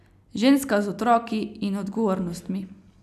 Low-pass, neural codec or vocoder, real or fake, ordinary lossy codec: 14.4 kHz; none; real; none